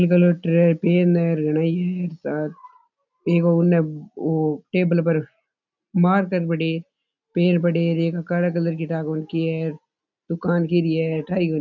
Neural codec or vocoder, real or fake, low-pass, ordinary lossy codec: none; real; 7.2 kHz; none